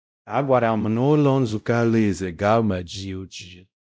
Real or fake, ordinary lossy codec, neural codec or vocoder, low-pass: fake; none; codec, 16 kHz, 0.5 kbps, X-Codec, WavLM features, trained on Multilingual LibriSpeech; none